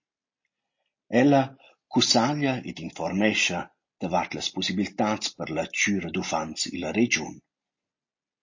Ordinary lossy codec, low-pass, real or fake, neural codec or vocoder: MP3, 32 kbps; 7.2 kHz; real; none